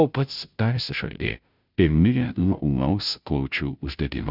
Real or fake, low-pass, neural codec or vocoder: fake; 5.4 kHz; codec, 16 kHz, 0.5 kbps, FunCodec, trained on Chinese and English, 25 frames a second